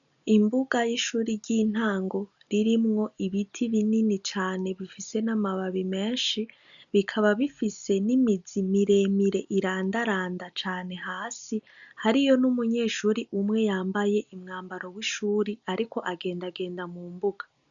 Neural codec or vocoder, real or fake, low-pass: none; real; 7.2 kHz